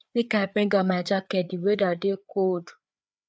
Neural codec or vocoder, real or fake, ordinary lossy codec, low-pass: codec, 16 kHz, 4 kbps, FreqCodec, larger model; fake; none; none